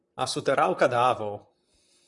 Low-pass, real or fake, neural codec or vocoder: 10.8 kHz; fake; vocoder, 44.1 kHz, 128 mel bands, Pupu-Vocoder